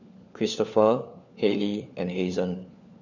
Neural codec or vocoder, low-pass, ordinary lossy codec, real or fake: codec, 16 kHz, 4 kbps, FunCodec, trained on LibriTTS, 50 frames a second; 7.2 kHz; none; fake